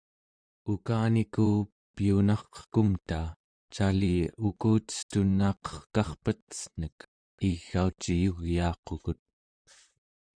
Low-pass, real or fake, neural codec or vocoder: 9.9 kHz; fake; vocoder, 44.1 kHz, 128 mel bands, Pupu-Vocoder